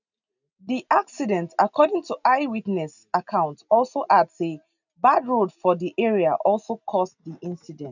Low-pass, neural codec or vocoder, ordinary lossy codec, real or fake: 7.2 kHz; none; none; real